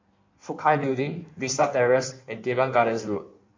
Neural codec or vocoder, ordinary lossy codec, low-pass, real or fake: codec, 16 kHz in and 24 kHz out, 1.1 kbps, FireRedTTS-2 codec; MP3, 64 kbps; 7.2 kHz; fake